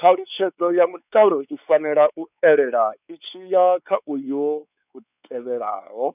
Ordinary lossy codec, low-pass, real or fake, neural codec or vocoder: none; 3.6 kHz; fake; codec, 16 kHz, 4 kbps, X-Codec, WavLM features, trained on Multilingual LibriSpeech